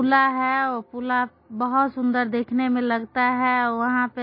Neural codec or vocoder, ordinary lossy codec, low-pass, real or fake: none; MP3, 24 kbps; 5.4 kHz; real